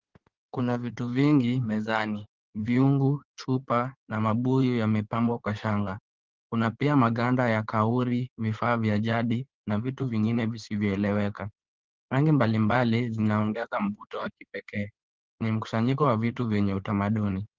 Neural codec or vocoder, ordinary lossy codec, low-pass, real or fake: codec, 16 kHz in and 24 kHz out, 2.2 kbps, FireRedTTS-2 codec; Opus, 16 kbps; 7.2 kHz; fake